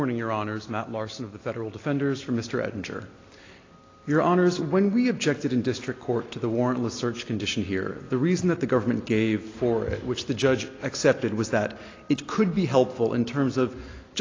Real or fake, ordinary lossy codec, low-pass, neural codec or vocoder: real; AAC, 32 kbps; 7.2 kHz; none